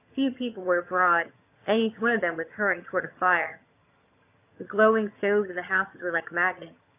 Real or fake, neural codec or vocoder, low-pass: fake; vocoder, 22.05 kHz, 80 mel bands, HiFi-GAN; 3.6 kHz